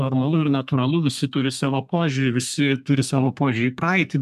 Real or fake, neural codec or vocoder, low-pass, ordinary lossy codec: fake; codec, 32 kHz, 1.9 kbps, SNAC; 14.4 kHz; AAC, 96 kbps